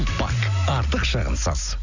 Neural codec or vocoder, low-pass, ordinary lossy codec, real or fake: none; 7.2 kHz; none; real